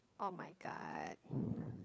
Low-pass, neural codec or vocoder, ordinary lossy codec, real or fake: none; codec, 16 kHz, 4 kbps, FreqCodec, larger model; none; fake